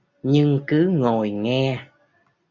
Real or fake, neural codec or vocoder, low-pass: real; none; 7.2 kHz